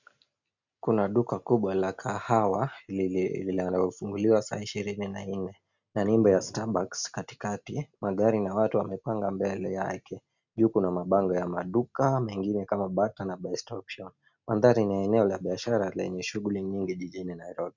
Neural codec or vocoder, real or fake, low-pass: none; real; 7.2 kHz